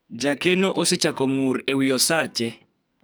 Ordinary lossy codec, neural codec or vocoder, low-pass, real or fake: none; codec, 44.1 kHz, 2.6 kbps, SNAC; none; fake